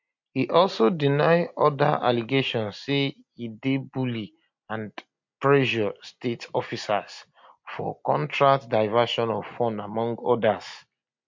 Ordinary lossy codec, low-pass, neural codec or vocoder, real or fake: MP3, 48 kbps; 7.2 kHz; none; real